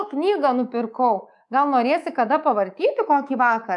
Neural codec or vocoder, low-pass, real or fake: codec, 24 kHz, 3.1 kbps, DualCodec; 10.8 kHz; fake